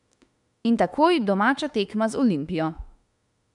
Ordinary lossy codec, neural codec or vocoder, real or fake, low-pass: none; autoencoder, 48 kHz, 32 numbers a frame, DAC-VAE, trained on Japanese speech; fake; 10.8 kHz